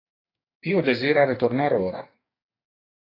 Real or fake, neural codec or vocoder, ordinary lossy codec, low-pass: fake; codec, 44.1 kHz, 2.6 kbps, DAC; AAC, 24 kbps; 5.4 kHz